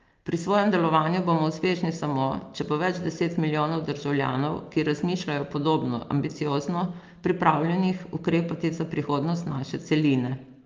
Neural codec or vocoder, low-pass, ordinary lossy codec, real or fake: none; 7.2 kHz; Opus, 24 kbps; real